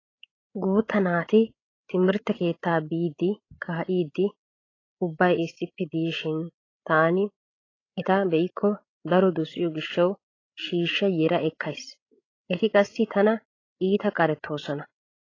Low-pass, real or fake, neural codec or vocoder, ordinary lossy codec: 7.2 kHz; fake; codec, 16 kHz, 16 kbps, FreqCodec, larger model; AAC, 32 kbps